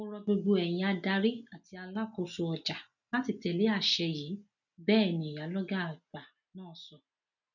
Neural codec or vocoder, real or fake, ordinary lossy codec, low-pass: none; real; none; 7.2 kHz